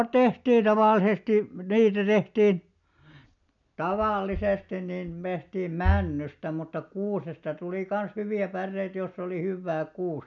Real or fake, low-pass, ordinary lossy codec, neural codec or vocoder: real; 7.2 kHz; none; none